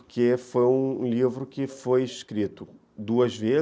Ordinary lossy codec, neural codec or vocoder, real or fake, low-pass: none; none; real; none